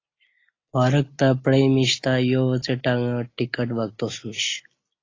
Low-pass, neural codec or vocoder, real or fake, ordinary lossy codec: 7.2 kHz; none; real; AAC, 32 kbps